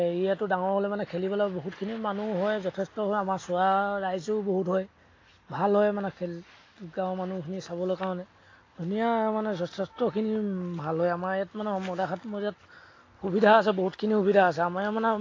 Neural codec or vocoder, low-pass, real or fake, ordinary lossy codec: none; 7.2 kHz; real; AAC, 32 kbps